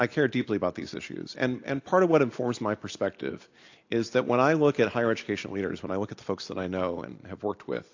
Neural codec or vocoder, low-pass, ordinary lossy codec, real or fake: none; 7.2 kHz; AAC, 48 kbps; real